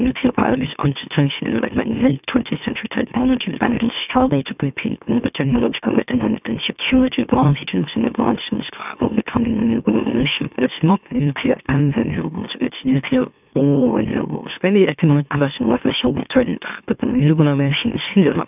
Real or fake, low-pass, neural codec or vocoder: fake; 3.6 kHz; autoencoder, 44.1 kHz, a latent of 192 numbers a frame, MeloTTS